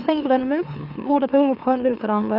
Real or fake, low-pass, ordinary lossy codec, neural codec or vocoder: fake; 5.4 kHz; none; autoencoder, 44.1 kHz, a latent of 192 numbers a frame, MeloTTS